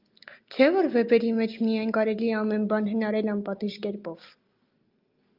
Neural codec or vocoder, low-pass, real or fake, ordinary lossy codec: none; 5.4 kHz; real; Opus, 24 kbps